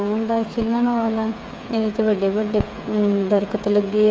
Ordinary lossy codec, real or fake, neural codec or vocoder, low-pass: none; fake; codec, 16 kHz, 8 kbps, FreqCodec, smaller model; none